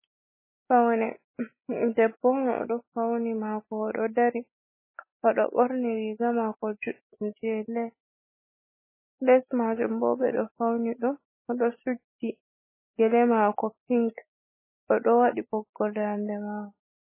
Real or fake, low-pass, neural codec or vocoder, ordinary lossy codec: real; 3.6 kHz; none; MP3, 16 kbps